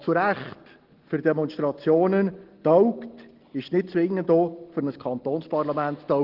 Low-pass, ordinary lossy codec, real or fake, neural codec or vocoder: 5.4 kHz; Opus, 16 kbps; real; none